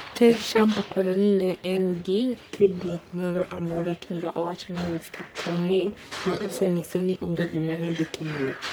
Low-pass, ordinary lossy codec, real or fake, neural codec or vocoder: none; none; fake; codec, 44.1 kHz, 1.7 kbps, Pupu-Codec